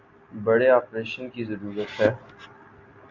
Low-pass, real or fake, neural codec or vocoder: 7.2 kHz; real; none